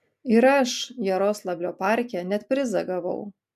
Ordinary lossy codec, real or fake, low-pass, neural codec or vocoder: MP3, 96 kbps; real; 14.4 kHz; none